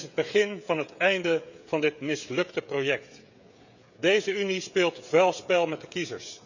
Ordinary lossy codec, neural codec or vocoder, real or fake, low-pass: none; codec, 16 kHz, 16 kbps, FreqCodec, smaller model; fake; 7.2 kHz